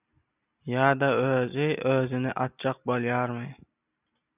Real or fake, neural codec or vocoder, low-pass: real; none; 3.6 kHz